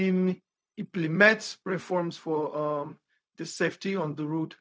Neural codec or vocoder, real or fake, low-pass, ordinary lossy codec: codec, 16 kHz, 0.4 kbps, LongCat-Audio-Codec; fake; none; none